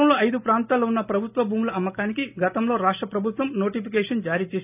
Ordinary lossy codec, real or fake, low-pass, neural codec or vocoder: none; real; 3.6 kHz; none